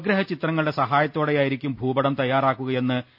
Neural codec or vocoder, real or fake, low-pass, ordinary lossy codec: none; real; 5.4 kHz; none